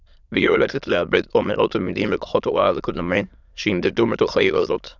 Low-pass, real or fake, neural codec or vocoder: 7.2 kHz; fake; autoencoder, 22.05 kHz, a latent of 192 numbers a frame, VITS, trained on many speakers